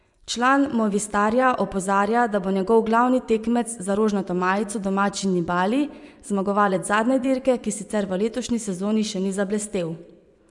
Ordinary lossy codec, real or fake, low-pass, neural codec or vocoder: none; fake; 10.8 kHz; vocoder, 24 kHz, 100 mel bands, Vocos